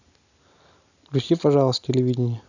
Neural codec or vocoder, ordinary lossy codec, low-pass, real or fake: none; none; 7.2 kHz; real